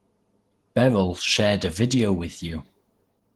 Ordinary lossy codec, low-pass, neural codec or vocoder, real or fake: Opus, 16 kbps; 19.8 kHz; vocoder, 48 kHz, 128 mel bands, Vocos; fake